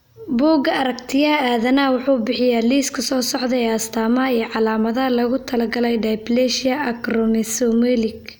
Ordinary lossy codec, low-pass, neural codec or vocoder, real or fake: none; none; none; real